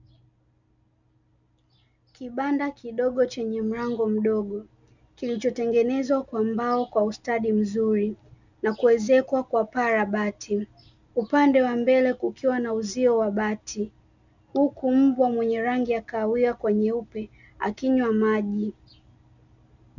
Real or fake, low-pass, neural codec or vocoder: real; 7.2 kHz; none